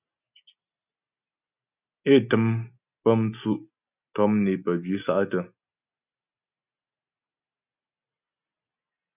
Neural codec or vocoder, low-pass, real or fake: none; 3.6 kHz; real